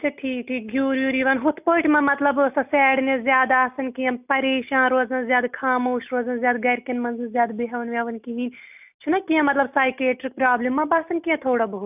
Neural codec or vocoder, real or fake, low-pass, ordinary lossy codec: none; real; 3.6 kHz; none